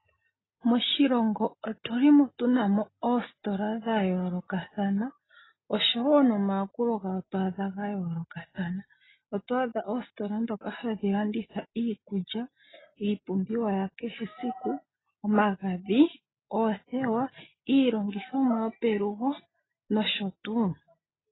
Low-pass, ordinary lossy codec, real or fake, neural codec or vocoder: 7.2 kHz; AAC, 16 kbps; real; none